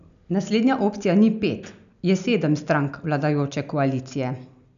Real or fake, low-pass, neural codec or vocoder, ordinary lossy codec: real; 7.2 kHz; none; none